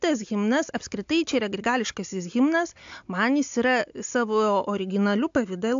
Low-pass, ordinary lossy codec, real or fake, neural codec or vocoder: 7.2 kHz; AAC, 64 kbps; real; none